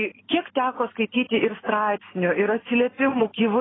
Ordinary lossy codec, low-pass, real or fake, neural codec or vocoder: AAC, 16 kbps; 7.2 kHz; real; none